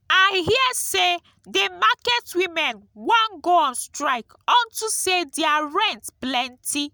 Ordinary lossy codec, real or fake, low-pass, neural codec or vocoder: none; real; none; none